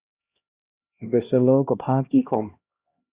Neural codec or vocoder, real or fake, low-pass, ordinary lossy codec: codec, 16 kHz, 1 kbps, X-Codec, HuBERT features, trained on LibriSpeech; fake; 3.6 kHz; Opus, 64 kbps